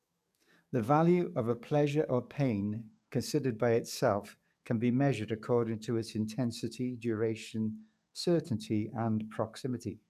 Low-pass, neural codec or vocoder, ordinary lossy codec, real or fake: 14.4 kHz; codec, 44.1 kHz, 7.8 kbps, DAC; none; fake